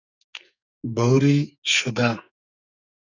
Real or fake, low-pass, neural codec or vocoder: fake; 7.2 kHz; codec, 44.1 kHz, 2.6 kbps, SNAC